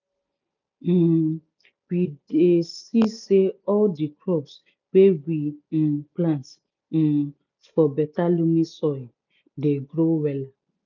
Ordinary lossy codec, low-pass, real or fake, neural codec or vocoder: none; 7.2 kHz; real; none